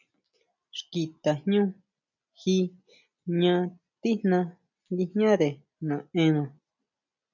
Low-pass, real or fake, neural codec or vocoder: 7.2 kHz; real; none